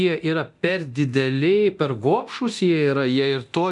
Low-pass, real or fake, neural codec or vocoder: 10.8 kHz; fake; codec, 24 kHz, 0.9 kbps, DualCodec